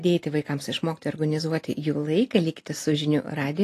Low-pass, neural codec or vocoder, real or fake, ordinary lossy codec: 14.4 kHz; none; real; AAC, 48 kbps